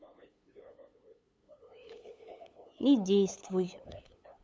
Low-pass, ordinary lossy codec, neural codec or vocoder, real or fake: none; none; codec, 16 kHz, 8 kbps, FunCodec, trained on LibriTTS, 25 frames a second; fake